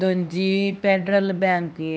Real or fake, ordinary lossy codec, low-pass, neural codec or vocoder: fake; none; none; codec, 16 kHz, 2 kbps, X-Codec, HuBERT features, trained on LibriSpeech